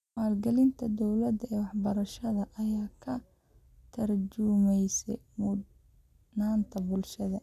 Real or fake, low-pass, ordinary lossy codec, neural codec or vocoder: real; 14.4 kHz; none; none